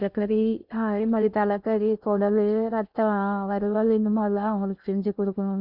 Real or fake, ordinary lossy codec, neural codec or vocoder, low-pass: fake; Opus, 64 kbps; codec, 16 kHz in and 24 kHz out, 0.6 kbps, FocalCodec, streaming, 2048 codes; 5.4 kHz